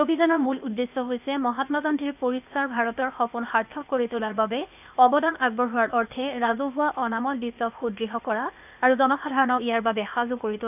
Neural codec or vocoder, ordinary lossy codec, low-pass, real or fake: codec, 16 kHz, 0.8 kbps, ZipCodec; none; 3.6 kHz; fake